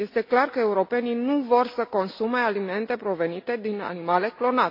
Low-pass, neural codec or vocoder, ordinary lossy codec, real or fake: 5.4 kHz; none; MP3, 32 kbps; real